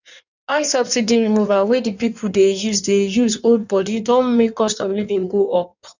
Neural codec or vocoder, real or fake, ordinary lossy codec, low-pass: codec, 16 kHz in and 24 kHz out, 1.1 kbps, FireRedTTS-2 codec; fake; none; 7.2 kHz